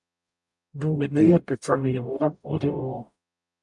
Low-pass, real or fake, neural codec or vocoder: 10.8 kHz; fake; codec, 44.1 kHz, 0.9 kbps, DAC